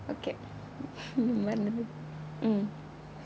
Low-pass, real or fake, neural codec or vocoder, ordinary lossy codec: none; real; none; none